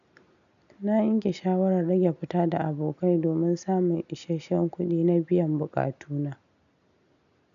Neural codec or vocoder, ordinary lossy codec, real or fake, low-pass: none; none; real; 7.2 kHz